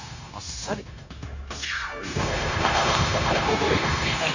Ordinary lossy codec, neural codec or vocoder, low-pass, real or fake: Opus, 64 kbps; codec, 16 kHz, 0.9 kbps, LongCat-Audio-Codec; 7.2 kHz; fake